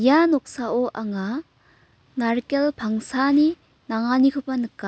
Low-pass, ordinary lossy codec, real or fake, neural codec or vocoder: none; none; real; none